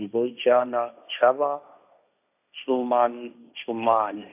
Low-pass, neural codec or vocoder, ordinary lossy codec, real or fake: 3.6 kHz; codec, 16 kHz, 1.1 kbps, Voila-Tokenizer; AAC, 32 kbps; fake